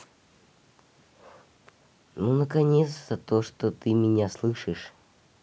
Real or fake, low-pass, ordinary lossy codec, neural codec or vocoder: real; none; none; none